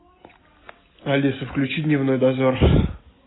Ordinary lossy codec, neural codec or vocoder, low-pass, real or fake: AAC, 16 kbps; none; 7.2 kHz; real